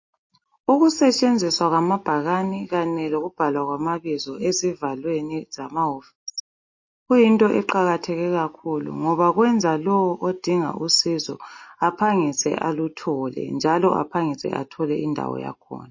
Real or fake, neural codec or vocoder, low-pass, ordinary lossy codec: real; none; 7.2 kHz; MP3, 32 kbps